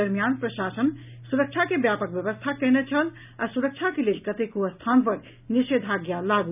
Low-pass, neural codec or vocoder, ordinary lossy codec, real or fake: 3.6 kHz; none; none; real